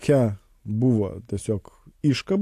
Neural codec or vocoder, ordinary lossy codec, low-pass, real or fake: none; AAC, 64 kbps; 14.4 kHz; real